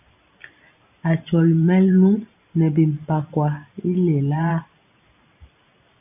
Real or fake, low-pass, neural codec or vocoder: fake; 3.6 kHz; vocoder, 44.1 kHz, 128 mel bands every 512 samples, BigVGAN v2